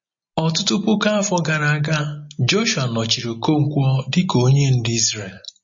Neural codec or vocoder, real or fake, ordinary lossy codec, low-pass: none; real; MP3, 32 kbps; 7.2 kHz